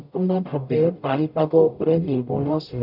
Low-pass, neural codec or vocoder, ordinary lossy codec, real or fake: 5.4 kHz; codec, 44.1 kHz, 0.9 kbps, DAC; none; fake